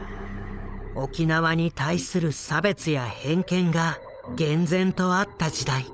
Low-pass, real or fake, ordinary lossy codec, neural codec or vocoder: none; fake; none; codec, 16 kHz, 16 kbps, FunCodec, trained on LibriTTS, 50 frames a second